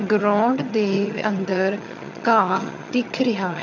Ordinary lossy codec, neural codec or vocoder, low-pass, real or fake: none; vocoder, 22.05 kHz, 80 mel bands, HiFi-GAN; 7.2 kHz; fake